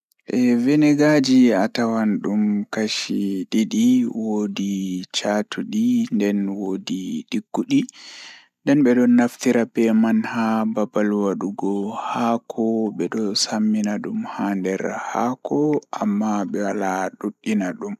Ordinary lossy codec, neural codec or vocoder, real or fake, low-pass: none; none; real; 14.4 kHz